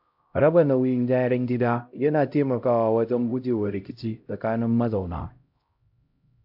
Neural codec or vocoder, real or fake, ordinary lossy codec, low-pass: codec, 16 kHz, 0.5 kbps, X-Codec, HuBERT features, trained on LibriSpeech; fake; AAC, 48 kbps; 5.4 kHz